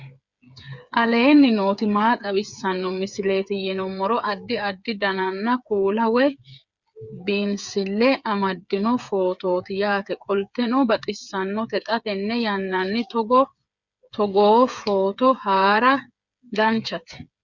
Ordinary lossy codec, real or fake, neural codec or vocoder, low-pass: Opus, 64 kbps; fake; codec, 16 kHz, 8 kbps, FreqCodec, smaller model; 7.2 kHz